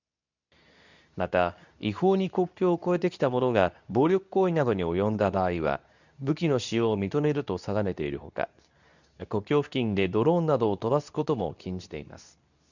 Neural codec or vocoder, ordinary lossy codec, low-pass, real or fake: codec, 24 kHz, 0.9 kbps, WavTokenizer, medium speech release version 2; none; 7.2 kHz; fake